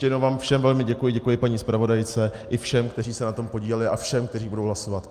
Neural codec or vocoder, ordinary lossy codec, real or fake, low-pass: none; Opus, 32 kbps; real; 14.4 kHz